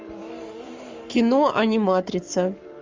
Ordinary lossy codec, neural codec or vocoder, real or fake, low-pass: Opus, 32 kbps; codec, 44.1 kHz, 7.8 kbps, Pupu-Codec; fake; 7.2 kHz